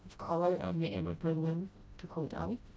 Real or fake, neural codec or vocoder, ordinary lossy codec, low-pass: fake; codec, 16 kHz, 0.5 kbps, FreqCodec, smaller model; none; none